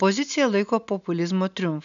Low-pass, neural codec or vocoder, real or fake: 7.2 kHz; none; real